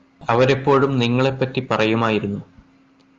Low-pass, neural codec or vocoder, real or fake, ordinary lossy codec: 7.2 kHz; none; real; Opus, 24 kbps